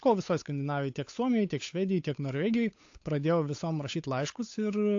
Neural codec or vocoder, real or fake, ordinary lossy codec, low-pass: codec, 16 kHz, 8 kbps, FunCodec, trained on Chinese and English, 25 frames a second; fake; AAC, 48 kbps; 7.2 kHz